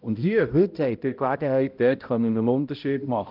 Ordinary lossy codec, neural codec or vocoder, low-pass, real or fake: Opus, 24 kbps; codec, 16 kHz, 0.5 kbps, X-Codec, HuBERT features, trained on balanced general audio; 5.4 kHz; fake